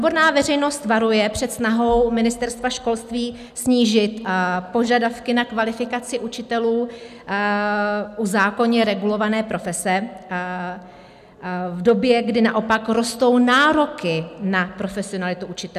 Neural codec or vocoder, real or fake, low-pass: none; real; 14.4 kHz